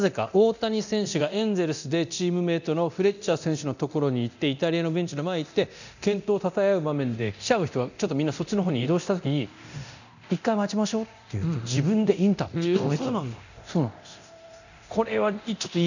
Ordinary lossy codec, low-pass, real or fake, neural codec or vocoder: none; 7.2 kHz; fake; codec, 24 kHz, 0.9 kbps, DualCodec